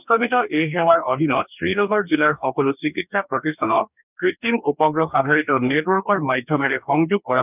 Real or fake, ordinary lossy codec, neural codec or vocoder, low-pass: fake; none; codec, 44.1 kHz, 2.6 kbps, DAC; 3.6 kHz